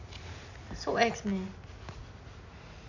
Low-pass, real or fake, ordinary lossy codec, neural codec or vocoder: 7.2 kHz; real; none; none